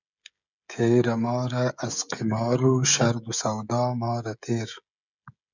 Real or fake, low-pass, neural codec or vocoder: fake; 7.2 kHz; codec, 16 kHz, 16 kbps, FreqCodec, smaller model